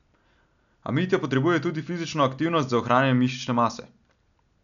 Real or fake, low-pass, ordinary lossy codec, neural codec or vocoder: real; 7.2 kHz; none; none